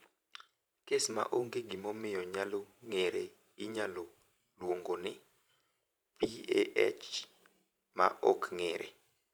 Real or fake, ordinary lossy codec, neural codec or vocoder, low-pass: fake; none; vocoder, 44.1 kHz, 128 mel bands every 512 samples, BigVGAN v2; none